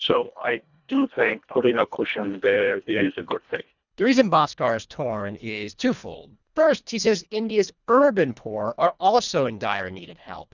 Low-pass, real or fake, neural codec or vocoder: 7.2 kHz; fake; codec, 24 kHz, 1.5 kbps, HILCodec